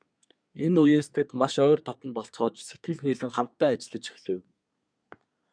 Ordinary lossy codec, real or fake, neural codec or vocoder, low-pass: MP3, 96 kbps; fake; codec, 24 kHz, 1 kbps, SNAC; 9.9 kHz